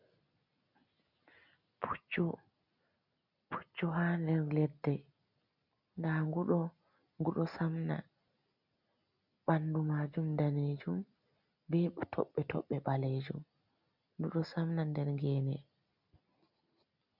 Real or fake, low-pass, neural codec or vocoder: real; 5.4 kHz; none